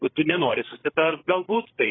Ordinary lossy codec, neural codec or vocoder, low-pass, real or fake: AAC, 16 kbps; codec, 16 kHz, 8 kbps, FreqCodec, larger model; 7.2 kHz; fake